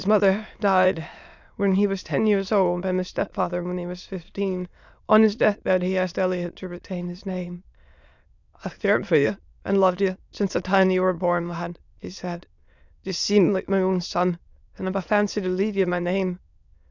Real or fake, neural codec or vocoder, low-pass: fake; autoencoder, 22.05 kHz, a latent of 192 numbers a frame, VITS, trained on many speakers; 7.2 kHz